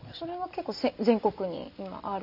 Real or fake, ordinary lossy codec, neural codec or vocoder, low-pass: real; none; none; 5.4 kHz